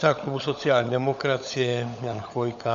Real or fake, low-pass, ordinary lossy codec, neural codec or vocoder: fake; 7.2 kHz; AAC, 96 kbps; codec, 16 kHz, 16 kbps, FunCodec, trained on LibriTTS, 50 frames a second